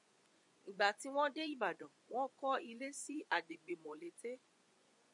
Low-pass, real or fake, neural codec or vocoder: 10.8 kHz; fake; vocoder, 24 kHz, 100 mel bands, Vocos